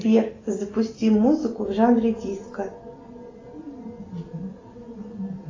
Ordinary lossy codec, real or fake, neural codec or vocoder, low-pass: AAC, 32 kbps; real; none; 7.2 kHz